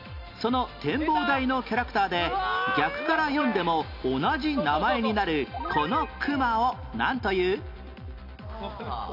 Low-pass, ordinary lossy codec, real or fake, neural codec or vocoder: 5.4 kHz; none; real; none